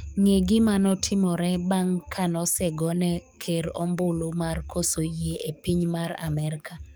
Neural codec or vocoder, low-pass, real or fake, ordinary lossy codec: codec, 44.1 kHz, 7.8 kbps, DAC; none; fake; none